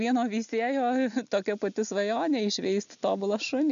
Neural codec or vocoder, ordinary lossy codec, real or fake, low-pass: none; MP3, 96 kbps; real; 7.2 kHz